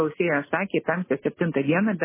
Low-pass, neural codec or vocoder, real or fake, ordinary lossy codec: 3.6 kHz; none; real; MP3, 16 kbps